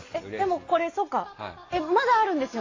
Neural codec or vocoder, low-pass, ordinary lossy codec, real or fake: none; 7.2 kHz; AAC, 32 kbps; real